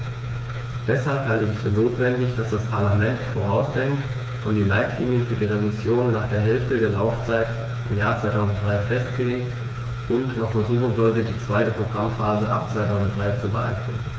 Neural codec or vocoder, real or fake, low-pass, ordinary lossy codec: codec, 16 kHz, 4 kbps, FreqCodec, smaller model; fake; none; none